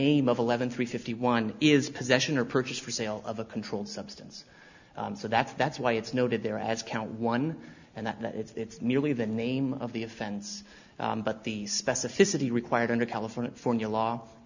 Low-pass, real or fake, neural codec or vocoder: 7.2 kHz; real; none